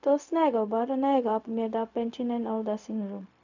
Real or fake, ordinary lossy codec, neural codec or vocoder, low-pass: fake; none; codec, 16 kHz, 0.4 kbps, LongCat-Audio-Codec; 7.2 kHz